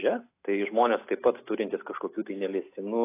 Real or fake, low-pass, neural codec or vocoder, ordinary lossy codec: real; 3.6 kHz; none; AAC, 24 kbps